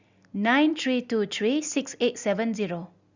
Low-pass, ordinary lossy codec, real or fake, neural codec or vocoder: 7.2 kHz; Opus, 64 kbps; real; none